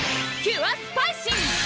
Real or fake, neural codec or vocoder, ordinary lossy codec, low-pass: real; none; none; none